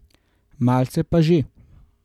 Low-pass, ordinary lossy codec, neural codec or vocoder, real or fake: 19.8 kHz; none; none; real